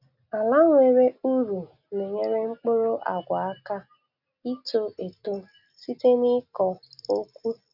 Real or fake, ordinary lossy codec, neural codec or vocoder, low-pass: real; none; none; 5.4 kHz